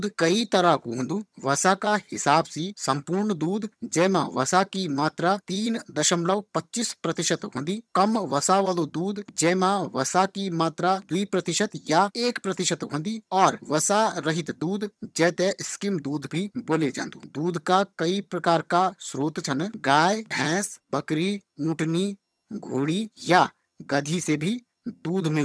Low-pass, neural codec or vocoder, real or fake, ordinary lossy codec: none; vocoder, 22.05 kHz, 80 mel bands, HiFi-GAN; fake; none